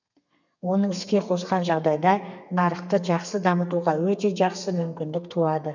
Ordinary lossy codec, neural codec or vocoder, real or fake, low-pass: none; codec, 32 kHz, 1.9 kbps, SNAC; fake; 7.2 kHz